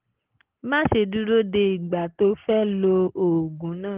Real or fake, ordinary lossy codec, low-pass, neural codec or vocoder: real; Opus, 16 kbps; 3.6 kHz; none